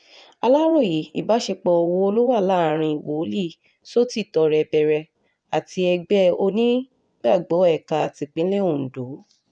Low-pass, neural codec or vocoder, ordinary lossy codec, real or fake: 9.9 kHz; vocoder, 24 kHz, 100 mel bands, Vocos; none; fake